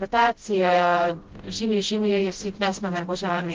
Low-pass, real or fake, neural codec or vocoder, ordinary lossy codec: 7.2 kHz; fake; codec, 16 kHz, 0.5 kbps, FreqCodec, smaller model; Opus, 16 kbps